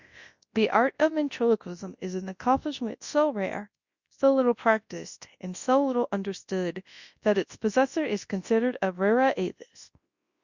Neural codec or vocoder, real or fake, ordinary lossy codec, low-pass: codec, 24 kHz, 0.9 kbps, WavTokenizer, large speech release; fake; Opus, 64 kbps; 7.2 kHz